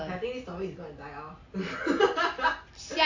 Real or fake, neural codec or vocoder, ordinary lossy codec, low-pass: real; none; AAC, 48 kbps; 7.2 kHz